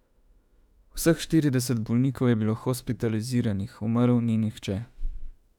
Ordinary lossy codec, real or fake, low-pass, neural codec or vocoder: none; fake; 19.8 kHz; autoencoder, 48 kHz, 32 numbers a frame, DAC-VAE, trained on Japanese speech